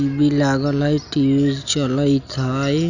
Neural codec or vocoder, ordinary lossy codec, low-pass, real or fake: none; none; 7.2 kHz; real